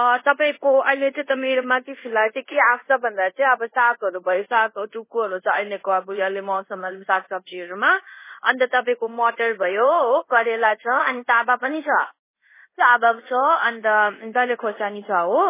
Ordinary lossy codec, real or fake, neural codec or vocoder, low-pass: MP3, 16 kbps; fake; codec, 24 kHz, 0.5 kbps, DualCodec; 3.6 kHz